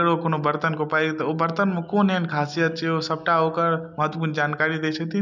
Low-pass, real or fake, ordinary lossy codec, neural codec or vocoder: 7.2 kHz; real; none; none